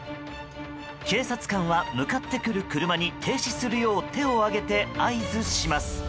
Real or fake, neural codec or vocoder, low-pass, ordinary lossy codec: real; none; none; none